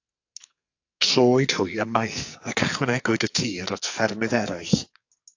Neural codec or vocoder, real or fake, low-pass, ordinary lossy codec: codec, 44.1 kHz, 2.6 kbps, SNAC; fake; 7.2 kHz; AAC, 48 kbps